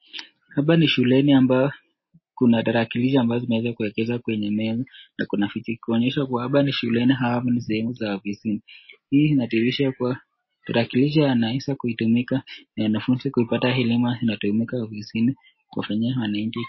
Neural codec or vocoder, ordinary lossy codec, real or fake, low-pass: none; MP3, 24 kbps; real; 7.2 kHz